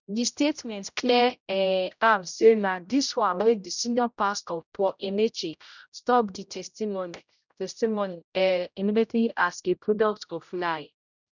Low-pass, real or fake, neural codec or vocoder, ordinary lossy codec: 7.2 kHz; fake; codec, 16 kHz, 0.5 kbps, X-Codec, HuBERT features, trained on general audio; none